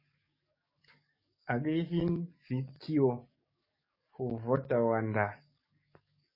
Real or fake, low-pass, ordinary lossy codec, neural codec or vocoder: fake; 5.4 kHz; MP3, 24 kbps; codec, 44.1 kHz, 7.8 kbps, DAC